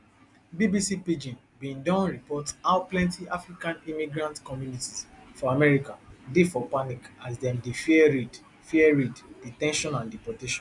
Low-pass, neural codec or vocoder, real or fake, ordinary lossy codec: 10.8 kHz; none; real; none